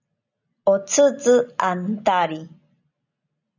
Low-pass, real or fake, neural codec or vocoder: 7.2 kHz; real; none